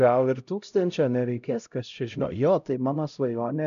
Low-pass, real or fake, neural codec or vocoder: 7.2 kHz; fake; codec, 16 kHz, 0.5 kbps, X-Codec, HuBERT features, trained on LibriSpeech